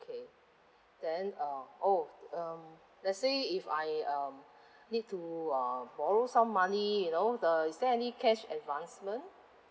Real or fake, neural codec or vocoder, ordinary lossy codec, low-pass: real; none; none; none